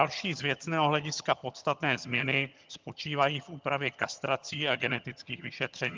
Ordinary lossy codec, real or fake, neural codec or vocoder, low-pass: Opus, 32 kbps; fake; vocoder, 22.05 kHz, 80 mel bands, HiFi-GAN; 7.2 kHz